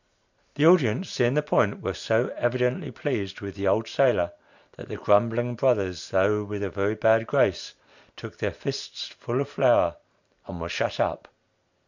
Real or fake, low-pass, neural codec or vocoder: real; 7.2 kHz; none